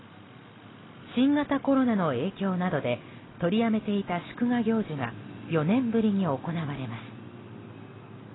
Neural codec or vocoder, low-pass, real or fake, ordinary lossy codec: none; 7.2 kHz; real; AAC, 16 kbps